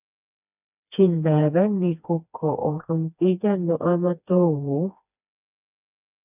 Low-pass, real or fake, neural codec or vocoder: 3.6 kHz; fake; codec, 16 kHz, 2 kbps, FreqCodec, smaller model